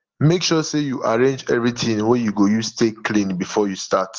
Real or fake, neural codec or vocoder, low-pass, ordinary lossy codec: real; none; 7.2 kHz; Opus, 16 kbps